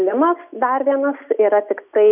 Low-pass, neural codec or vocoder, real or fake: 3.6 kHz; none; real